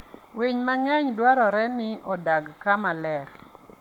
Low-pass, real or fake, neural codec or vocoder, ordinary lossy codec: 19.8 kHz; fake; codec, 44.1 kHz, 7.8 kbps, Pupu-Codec; none